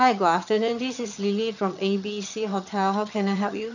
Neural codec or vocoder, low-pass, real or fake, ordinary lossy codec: vocoder, 22.05 kHz, 80 mel bands, HiFi-GAN; 7.2 kHz; fake; none